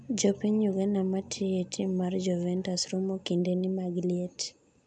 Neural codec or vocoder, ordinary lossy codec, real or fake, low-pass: none; none; real; 9.9 kHz